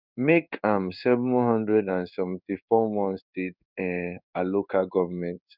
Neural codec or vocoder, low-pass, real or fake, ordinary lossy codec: codec, 16 kHz in and 24 kHz out, 1 kbps, XY-Tokenizer; 5.4 kHz; fake; none